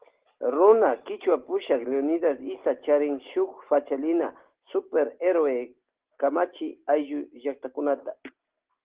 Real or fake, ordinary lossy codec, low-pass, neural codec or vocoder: real; Opus, 16 kbps; 3.6 kHz; none